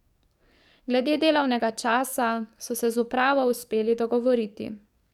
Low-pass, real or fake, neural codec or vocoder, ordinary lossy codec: 19.8 kHz; fake; codec, 44.1 kHz, 7.8 kbps, DAC; none